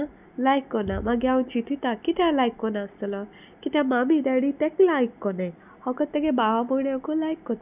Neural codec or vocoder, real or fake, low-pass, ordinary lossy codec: none; real; 3.6 kHz; none